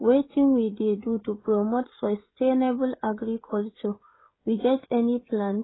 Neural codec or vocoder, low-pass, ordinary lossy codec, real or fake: codec, 16 kHz, 16 kbps, FreqCodec, smaller model; 7.2 kHz; AAC, 16 kbps; fake